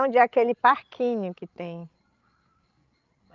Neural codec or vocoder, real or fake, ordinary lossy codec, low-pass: codec, 16 kHz, 16 kbps, FreqCodec, larger model; fake; Opus, 32 kbps; 7.2 kHz